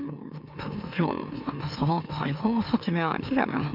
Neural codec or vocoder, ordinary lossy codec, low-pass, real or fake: autoencoder, 44.1 kHz, a latent of 192 numbers a frame, MeloTTS; none; 5.4 kHz; fake